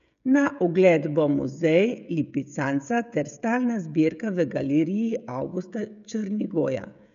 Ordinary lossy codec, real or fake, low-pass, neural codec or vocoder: none; fake; 7.2 kHz; codec, 16 kHz, 16 kbps, FreqCodec, smaller model